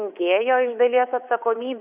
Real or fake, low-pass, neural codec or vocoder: fake; 3.6 kHz; codec, 24 kHz, 3.1 kbps, DualCodec